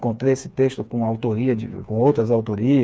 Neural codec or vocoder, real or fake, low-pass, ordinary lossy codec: codec, 16 kHz, 4 kbps, FreqCodec, smaller model; fake; none; none